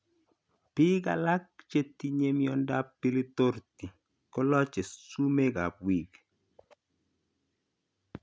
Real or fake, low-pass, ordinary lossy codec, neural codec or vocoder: real; none; none; none